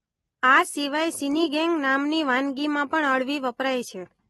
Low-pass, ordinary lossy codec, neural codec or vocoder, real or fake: 19.8 kHz; AAC, 32 kbps; none; real